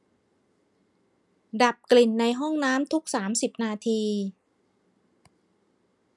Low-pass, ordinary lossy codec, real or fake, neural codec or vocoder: none; none; real; none